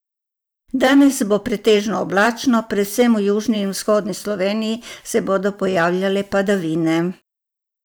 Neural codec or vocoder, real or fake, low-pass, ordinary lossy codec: vocoder, 44.1 kHz, 128 mel bands every 512 samples, BigVGAN v2; fake; none; none